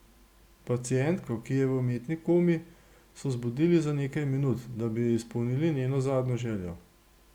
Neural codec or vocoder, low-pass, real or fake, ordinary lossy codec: none; 19.8 kHz; real; Opus, 64 kbps